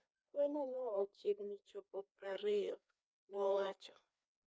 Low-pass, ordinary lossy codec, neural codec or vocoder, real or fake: none; none; codec, 16 kHz, 2 kbps, FreqCodec, larger model; fake